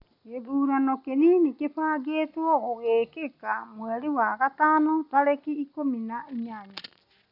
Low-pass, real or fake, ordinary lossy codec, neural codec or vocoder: 5.4 kHz; real; none; none